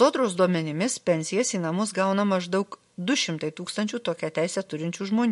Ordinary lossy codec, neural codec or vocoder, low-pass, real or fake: MP3, 48 kbps; none; 14.4 kHz; real